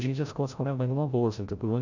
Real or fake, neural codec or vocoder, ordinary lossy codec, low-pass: fake; codec, 16 kHz, 0.5 kbps, FreqCodec, larger model; none; 7.2 kHz